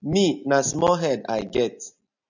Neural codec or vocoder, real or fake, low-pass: none; real; 7.2 kHz